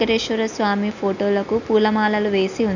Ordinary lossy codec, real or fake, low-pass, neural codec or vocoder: none; real; 7.2 kHz; none